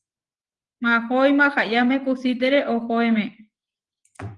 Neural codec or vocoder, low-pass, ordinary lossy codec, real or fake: vocoder, 24 kHz, 100 mel bands, Vocos; 10.8 kHz; Opus, 24 kbps; fake